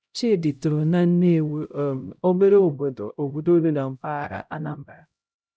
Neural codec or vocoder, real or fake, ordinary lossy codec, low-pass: codec, 16 kHz, 0.5 kbps, X-Codec, HuBERT features, trained on LibriSpeech; fake; none; none